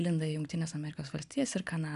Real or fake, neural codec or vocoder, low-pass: real; none; 10.8 kHz